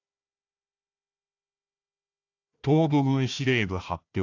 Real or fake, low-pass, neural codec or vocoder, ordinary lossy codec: fake; 7.2 kHz; codec, 16 kHz, 1 kbps, FunCodec, trained on Chinese and English, 50 frames a second; AAC, 48 kbps